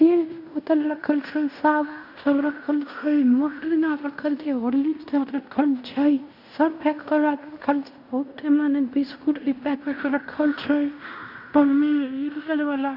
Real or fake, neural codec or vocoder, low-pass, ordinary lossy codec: fake; codec, 16 kHz in and 24 kHz out, 0.9 kbps, LongCat-Audio-Codec, fine tuned four codebook decoder; 5.4 kHz; none